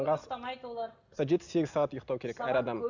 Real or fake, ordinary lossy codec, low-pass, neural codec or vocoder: real; none; 7.2 kHz; none